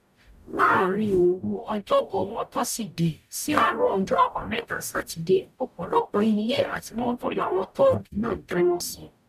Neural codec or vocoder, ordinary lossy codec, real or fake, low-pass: codec, 44.1 kHz, 0.9 kbps, DAC; none; fake; 14.4 kHz